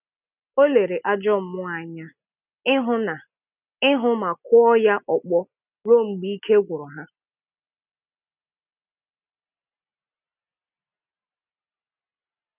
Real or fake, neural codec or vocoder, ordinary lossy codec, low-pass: real; none; none; 3.6 kHz